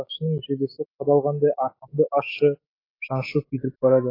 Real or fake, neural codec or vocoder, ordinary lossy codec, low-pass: fake; autoencoder, 48 kHz, 128 numbers a frame, DAC-VAE, trained on Japanese speech; AAC, 24 kbps; 5.4 kHz